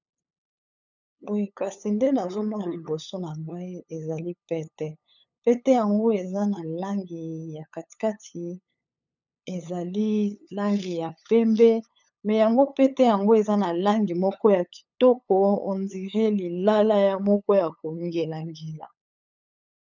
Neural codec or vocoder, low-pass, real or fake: codec, 16 kHz, 8 kbps, FunCodec, trained on LibriTTS, 25 frames a second; 7.2 kHz; fake